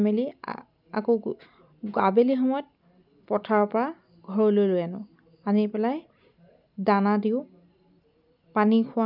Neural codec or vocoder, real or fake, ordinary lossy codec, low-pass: none; real; none; 5.4 kHz